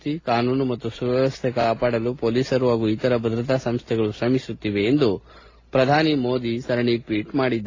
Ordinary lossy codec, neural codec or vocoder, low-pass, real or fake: AAC, 32 kbps; none; 7.2 kHz; real